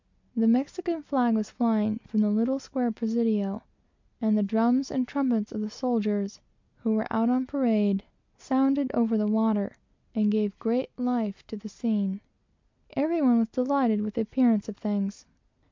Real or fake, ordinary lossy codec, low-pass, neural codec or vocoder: real; MP3, 64 kbps; 7.2 kHz; none